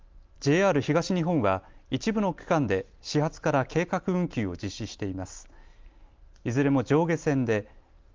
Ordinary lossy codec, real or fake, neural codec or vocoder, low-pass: Opus, 32 kbps; real; none; 7.2 kHz